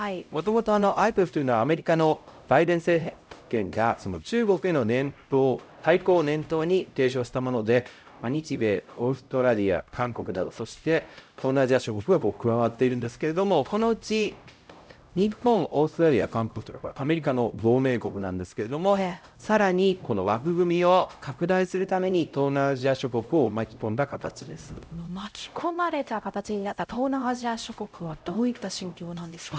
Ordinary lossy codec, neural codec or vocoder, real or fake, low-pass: none; codec, 16 kHz, 0.5 kbps, X-Codec, HuBERT features, trained on LibriSpeech; fake; none